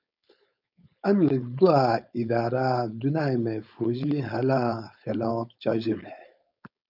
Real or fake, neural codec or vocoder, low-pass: fake; codec, 16 kHz, 4.8 kbps, FACodec; 5.4 kHz